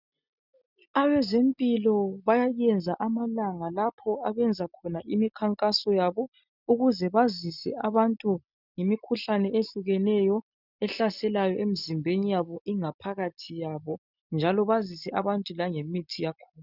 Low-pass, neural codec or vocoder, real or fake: 5.4 kHz; none; real